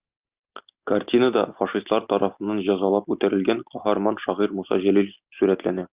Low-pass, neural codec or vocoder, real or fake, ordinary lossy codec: 3.6 kHz; none; real; Opus, 24 kbps